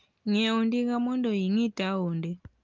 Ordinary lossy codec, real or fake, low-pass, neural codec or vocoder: Opus, 32 kbps; real; 7.2 kHz; none